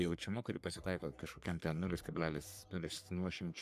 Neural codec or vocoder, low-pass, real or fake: codec, 44.1 kHz, 3.4 kbps, Pupu-Codec; 14.4 kHz; fake